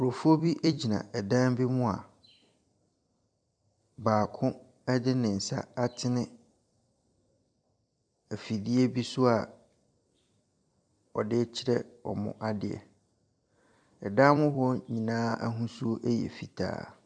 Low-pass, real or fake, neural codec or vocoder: 9.9 kHz; fake; vocoder, 44.1 kHz, 128 mel bands every 256 samples, BigVGAN v2